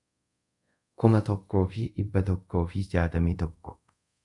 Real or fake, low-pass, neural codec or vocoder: fake; 10.8 kHz; codec, 24 kHz, 0.5 kbps, DualCodec